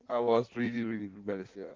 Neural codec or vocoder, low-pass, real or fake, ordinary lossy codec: codec, 16 kHz in and 24 kHz out, 1.1 kbps, FireRedTTS-2 codec; 7.2 kHz; fake; Opus, 32 kbps